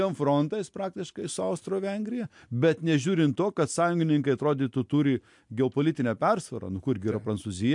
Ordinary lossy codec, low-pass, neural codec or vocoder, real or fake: MP3, 64 kbps; 10.8 kHz; none; real